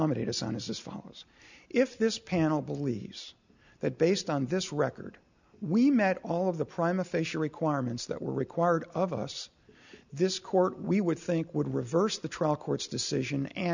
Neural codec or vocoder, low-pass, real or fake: none; 7.2 kHz; real